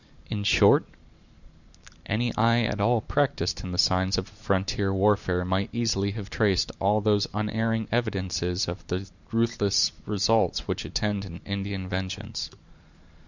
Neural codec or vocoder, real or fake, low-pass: vocoder, 44.1 kHz, 128 mel bands every 512 samples, BigVGAN v2; fake; 7.2 kHz